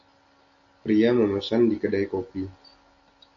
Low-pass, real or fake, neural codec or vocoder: 7.2 kHz; real; none